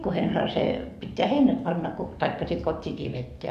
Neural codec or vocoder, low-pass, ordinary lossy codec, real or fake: codec, 44.1 kHz, 7.8 kbps, DAC; 14.4 kHz; Opus, 64 kbps; fake